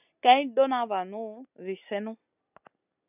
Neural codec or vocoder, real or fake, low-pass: none; real; 3.6 kHz